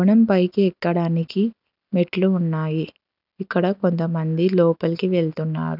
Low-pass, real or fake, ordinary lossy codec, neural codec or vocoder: 5.4 kHz; real; none; none